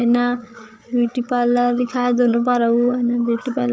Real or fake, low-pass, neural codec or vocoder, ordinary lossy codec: fake; none; codec, 16 kHz, 8 kbps, FreqCodec, larger model; none